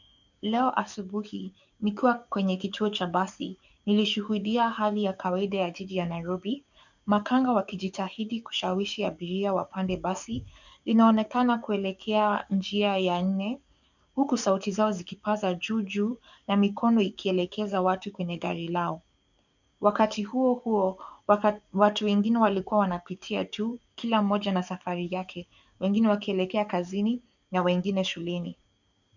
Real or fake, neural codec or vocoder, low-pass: fake; codec, 44.1 kHz, 7.8 kbps, DAC; 7.2 kHz